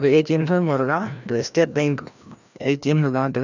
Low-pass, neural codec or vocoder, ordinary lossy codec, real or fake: 7.2 kHz; codec, 16 kHz, 1 kbps, FreqCodec, larger model; none; fake